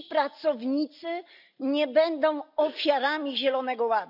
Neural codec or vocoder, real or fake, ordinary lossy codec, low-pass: none; real; none; 5.4 kHz